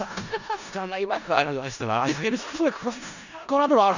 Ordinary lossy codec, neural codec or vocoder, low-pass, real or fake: none; codec, 16 kHz in and 24 kHz out, 0.4 kbps, LongCat-Audio-Codec, four codebook decoder; 7.2 kHz; fake